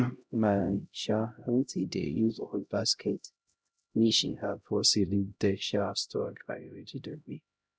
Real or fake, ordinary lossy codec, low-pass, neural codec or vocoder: fake; none; none; codec, 16 kHz, 0.5 kbps, X-Codec, HuBERT features, trained on LibriSpeech